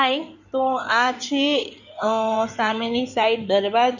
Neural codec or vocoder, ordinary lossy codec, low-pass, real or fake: codec, 16 kHz in and 24 kHz out, 2.2 kbps, FireRedTTS-2 codec; none; 7.2 kHz; fake